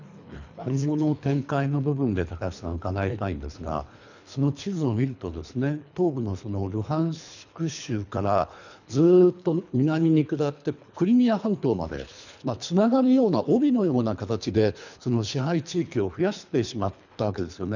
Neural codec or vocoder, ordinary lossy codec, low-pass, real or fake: codec, 24 kHz, 3 kbps, HILCodec; none; 7.2 kHz; fake